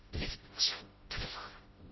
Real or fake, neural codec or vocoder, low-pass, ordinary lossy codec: fake; codec, 16 kHz, 0.5 kbps, FreqCodec, smaller model; 7.2 kHz; MP3, 24 kbps